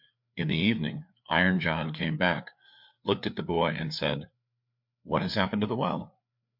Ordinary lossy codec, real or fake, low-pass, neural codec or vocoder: MP3, 48 kbps; fake; 5.4 kHz; codec, 16 kHz, 4 kbps, FreqCodec, larger model